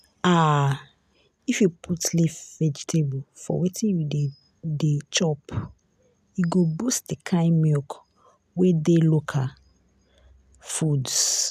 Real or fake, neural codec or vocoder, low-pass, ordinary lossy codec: real; none; 14.4 kHz; none